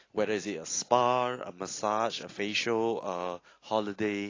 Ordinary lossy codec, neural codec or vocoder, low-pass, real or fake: AAC, 32 kbps; none; 7.2 kHz; real